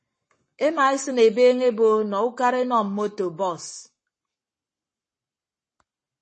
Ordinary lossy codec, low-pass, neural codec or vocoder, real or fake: MP3, 32 kbps; 10.8 kHz; codec, 44.1 kHz, 7.8 kbps, Pupu-Codec; fake